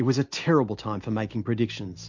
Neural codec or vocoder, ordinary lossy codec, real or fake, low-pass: none; MP3, 48 kbps; real; 7.2 kHz